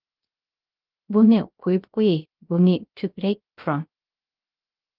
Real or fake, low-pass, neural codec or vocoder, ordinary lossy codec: fake; 5.4 kHz; codec, 16 kHz, 0.3 kbps, FocalCodec; Opus, 32 kbps